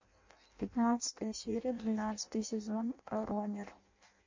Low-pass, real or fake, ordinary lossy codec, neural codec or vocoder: 7.2 kHz; fake; MP3, 48 kbps; codec, 16 kHz in and 24 kHz out, 0.6 kbps, FireRedTTS-2 codec